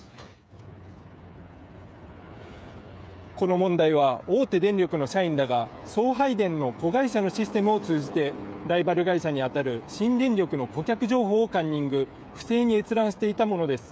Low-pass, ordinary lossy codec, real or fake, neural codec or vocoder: none; none; fake; codec, 16 kHz, 8 kbps, FreqCodec, smaller model